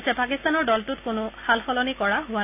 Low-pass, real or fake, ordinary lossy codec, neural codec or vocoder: 3.6 kHz; real; none; none